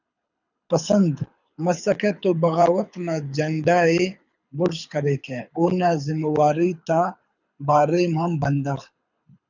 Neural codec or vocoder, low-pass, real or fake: codec, 24 kHz, 6 kbps, HILCodec; 7.2 kHz; fake